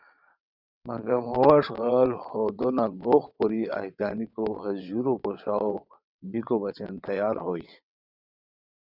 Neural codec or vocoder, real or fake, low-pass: vocoder, 22.05 kHz, 80 mel bands, WaveNeXt; fake; 5.4 kHz